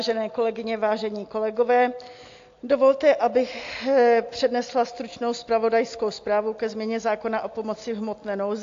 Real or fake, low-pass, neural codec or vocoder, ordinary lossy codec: real; 7.2 kHz; none; AAC, 48 kbps